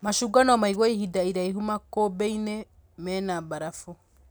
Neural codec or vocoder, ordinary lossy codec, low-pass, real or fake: none; none; none; real